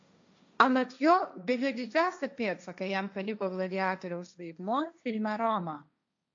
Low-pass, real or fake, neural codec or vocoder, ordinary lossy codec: 7.2 kHz; fake; codec, 16 kHz, 1.1 kbps, Voila-Tokenizer; AAC, 64 kbps